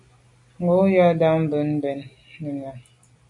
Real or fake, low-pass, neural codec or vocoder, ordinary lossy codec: real; 10.8 kHz; none; MP3, 96 kbps